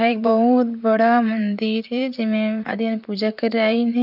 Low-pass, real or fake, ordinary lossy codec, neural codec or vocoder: 5.4 kHz; fake; none; vocoder, 44.1 kHz, 128 mel bands, Pupu-Vocoder